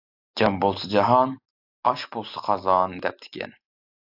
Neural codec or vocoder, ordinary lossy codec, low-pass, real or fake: none; AAC, 48 kbps; 5.4 kHz; real